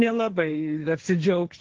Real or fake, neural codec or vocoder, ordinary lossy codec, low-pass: fake; codec, 16 kHz, 1.1 kbps, Voila-Tokenizer; Opus, 16 kbps; 7.2 kHz